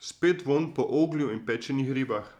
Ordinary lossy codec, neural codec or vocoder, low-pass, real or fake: none; vocoder, 44.1 kHz, 128 mel bands every 512 samples, BigVGAN v2; 19.8 kHz; fake